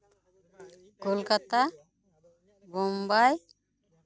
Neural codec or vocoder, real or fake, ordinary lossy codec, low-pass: none; real; none; none